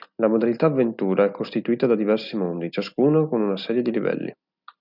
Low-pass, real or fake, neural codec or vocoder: 5.4 kHz; real; none